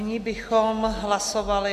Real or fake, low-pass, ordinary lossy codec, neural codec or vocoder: real; 14.4 kHz; AAC, 96 kbps; none